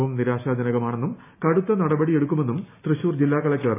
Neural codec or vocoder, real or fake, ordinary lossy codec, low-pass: none; real; AAC, 24 kbps; 3.6 kHz